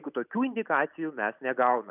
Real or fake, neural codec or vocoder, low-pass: real; none; 3.6 kHz